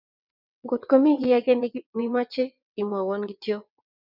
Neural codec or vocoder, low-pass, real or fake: vocoder, 22.05 kHz, 80 mel bands, WaveNeXt; 5.4 kHz; fake